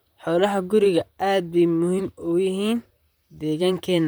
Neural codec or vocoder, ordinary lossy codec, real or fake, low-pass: vocoder, 44.1 kHz, 128 mel bands, Pupu-Vocoder; none; fake; none